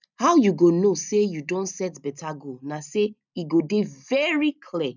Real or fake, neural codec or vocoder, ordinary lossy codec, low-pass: real; none; none; 7.2 kHz